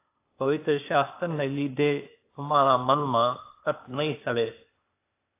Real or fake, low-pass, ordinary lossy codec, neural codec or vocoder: fake; 3.6 kHz; AAC, 24 kbps; codec, 16 kHz, 0.8 kbps, ZipCodec